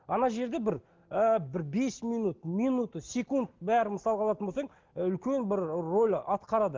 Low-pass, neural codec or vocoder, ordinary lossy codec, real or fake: 7.2 kHz; none; Opus, 16 kbps; real